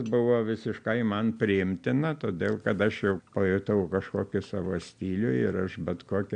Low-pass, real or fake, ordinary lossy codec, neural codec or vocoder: 9.9 kHz; real; AAC, 64 kbps; none